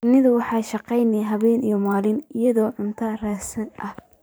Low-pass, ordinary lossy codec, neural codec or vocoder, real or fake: none; none; vocoder, 44.1 kHz, 128 mel bands every 512 samples, BigVGAN v2; fake